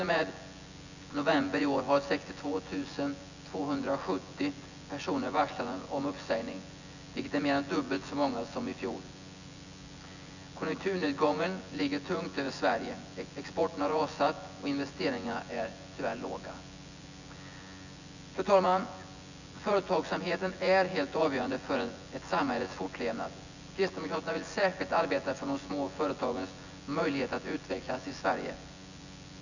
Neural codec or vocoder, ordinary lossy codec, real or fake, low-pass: vocoder, 24 kHz, 100 mel bands, Vocos; none; fake; 7.2 kHz